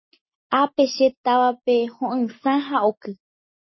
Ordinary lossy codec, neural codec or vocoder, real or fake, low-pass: MP3, 24 kbps; none; real; 7.2 kHz